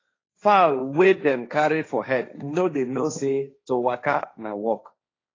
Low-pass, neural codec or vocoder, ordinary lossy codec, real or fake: 7.2 kHz; codec, 16 kHz, 1.1 kbps, Voila-Tokenizer; AAC, 32 kbps; fake